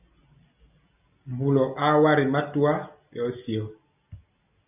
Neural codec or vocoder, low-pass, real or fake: none; 3.6 kHz; real